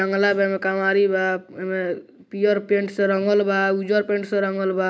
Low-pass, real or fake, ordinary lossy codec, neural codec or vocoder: none; real; none; none